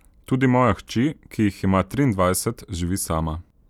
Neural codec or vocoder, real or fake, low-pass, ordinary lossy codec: none; real; 19.8 kHz; none